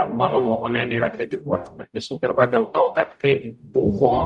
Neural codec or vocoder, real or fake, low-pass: codec, 44.1 kHz, 0.9 kbps, DAC; fake; 10.8 kHz